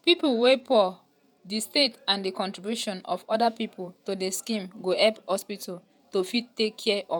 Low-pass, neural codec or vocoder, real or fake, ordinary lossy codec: none; vocoder, 48 kHz, 128 mel bands, Vocos; fake; none